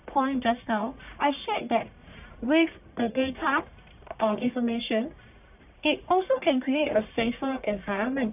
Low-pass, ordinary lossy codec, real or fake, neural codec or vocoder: 3.6 kHz; none; fake; codec, 44.1 kHz, 1.7 kbps, Pupu-Codec